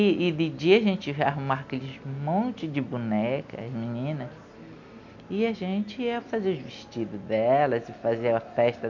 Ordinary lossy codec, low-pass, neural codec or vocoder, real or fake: none; 7.2 kHz; none; real